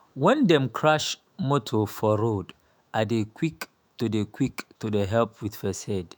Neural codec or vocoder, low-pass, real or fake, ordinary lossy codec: autoencoder, 48 kHz, 128 numbers a frame, DAC-VAE, trained on Japanese speech; none; fake; none